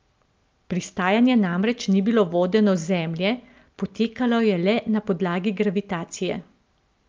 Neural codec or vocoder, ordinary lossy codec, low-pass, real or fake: none; Opus, 24 kbps; 7.2 kHz; real